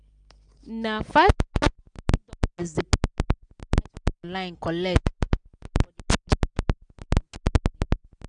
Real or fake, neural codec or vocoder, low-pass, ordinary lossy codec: real; none; 9.9 kHz; none